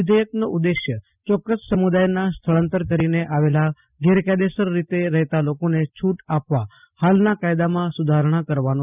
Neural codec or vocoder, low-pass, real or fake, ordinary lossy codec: none; 3.6 kHz; real; none